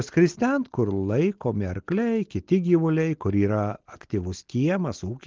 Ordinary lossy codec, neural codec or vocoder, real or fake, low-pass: Opus, 16 kbps; none; real; 7.2 kHz